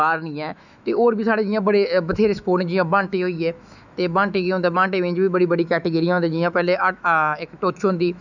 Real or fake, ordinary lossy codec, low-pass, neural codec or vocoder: fake; none; 7.2 kHz; autoencoder, 48 kHz, 128 numbers a frame, DAC-VAE, trained on Japanese speech